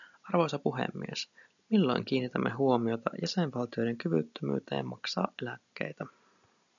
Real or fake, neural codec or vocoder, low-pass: real; none; 7.2 kHz